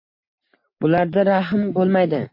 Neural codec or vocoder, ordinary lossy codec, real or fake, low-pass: none; MP3, 32 kbps; real; 5.4 kHz